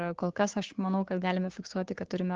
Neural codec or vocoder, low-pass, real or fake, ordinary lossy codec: codec, 16 kHz, 6 kbps, DAC; 7.2 kHz; fake; Opus, 16 kbps